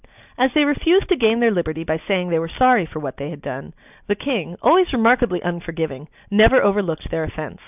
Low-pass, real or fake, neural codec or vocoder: 3.6 kHz; real; none